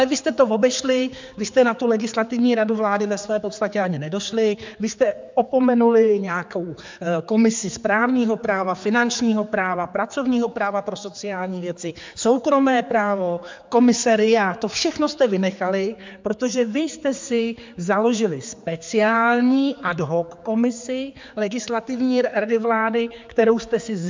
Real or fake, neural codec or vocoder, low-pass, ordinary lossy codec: fake; codec, 16 kHz, 4 kbps, X-Codec, HuBERT features, trained on general audio; 7.2 kHz; MP3, 64 kbps